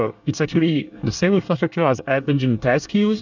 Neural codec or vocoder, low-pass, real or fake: codec, 24 kHz, 1 kbps, SNAC; 7.2 kHz; fake